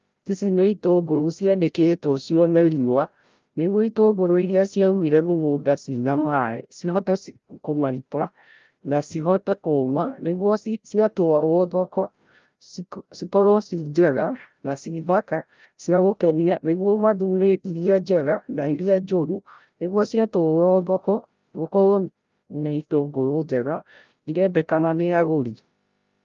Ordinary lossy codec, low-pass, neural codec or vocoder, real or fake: Opus, 32 kbps; 7.2 kHz; codec, 16 kHz, 0.5 kbps, FreqCodec, larger model; fake